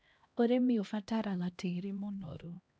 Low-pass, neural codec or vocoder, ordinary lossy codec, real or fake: none; codec, 16 kHz, 1 kbps, X-Codec, HuBERT features, trained on LibriSpeech; none; fake